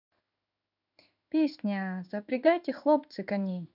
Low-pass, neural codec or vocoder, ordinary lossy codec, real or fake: 5.4 kHz; codec, 16 kHz in and 24 kHz out, 1 kbps, XY-Tokenizer; none; fake